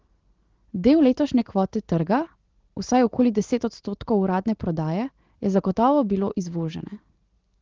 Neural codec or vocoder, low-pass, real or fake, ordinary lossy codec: none; 7.2 kHz; real; Opus, 16 kbps